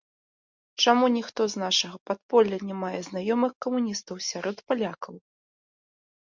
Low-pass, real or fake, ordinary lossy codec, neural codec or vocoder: 7.2 kHz; real; MP3, 64 kbps; none